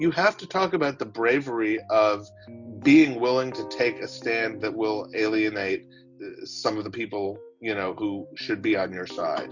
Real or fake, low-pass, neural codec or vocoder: real; 7.2 kHz; none